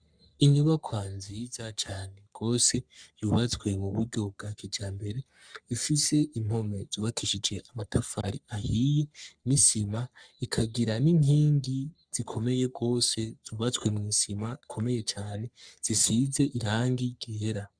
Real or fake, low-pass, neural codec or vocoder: fake; 9.9 kHz; codec, 44.1 kHz, 3.4 kbps, Pupu-Codec